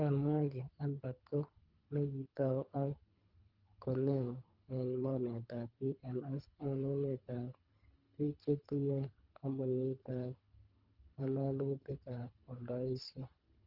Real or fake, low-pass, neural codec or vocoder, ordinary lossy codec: fake; 5.4 kHz; codec, 24 kHz, 3 kbps, HILCodec; Opus, 24 kbps